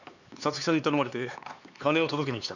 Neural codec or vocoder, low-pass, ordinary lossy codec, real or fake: codec, 16 kHz, 4 kbps, X-Codec, HuBERT features, trained on LibriSpeech; 7.2 kHz; none; fake